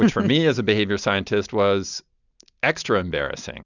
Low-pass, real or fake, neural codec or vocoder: 7.2 kHz; real; none